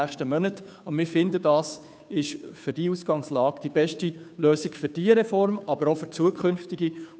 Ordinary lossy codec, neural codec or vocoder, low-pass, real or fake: none; codec, 16 kHz, 2 kbps, FunCodec, trained on Chinese and English, 25 frames a second; none; fake